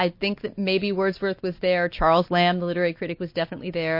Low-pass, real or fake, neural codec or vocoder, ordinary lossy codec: 5.4 kHz; real; none; MP3, 32 kbps